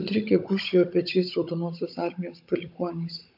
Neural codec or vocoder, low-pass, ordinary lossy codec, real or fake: codec, 16 kHz, 16 kbps, FunCodec, trained on Chinese and English, 50 frames a second; 5.4 kHz; AAC, 48 kbps; fake